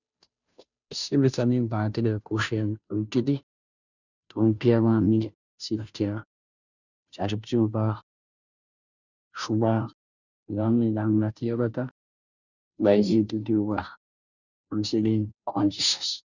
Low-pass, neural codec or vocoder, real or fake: 7.2 kHz; codec, 16 kHz, 0.5 kbps, FunCodec, trained on Chinese and English, 25 frames a second; fake